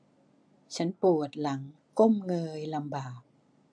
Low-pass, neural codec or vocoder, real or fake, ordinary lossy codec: 9.9 kHz; none; real; AAC, 64 kbps